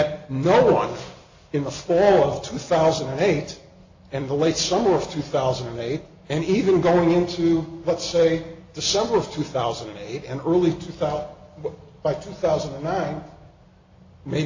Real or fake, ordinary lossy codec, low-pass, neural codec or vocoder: real; AAC, 48 kbps; 7.2 kHz; none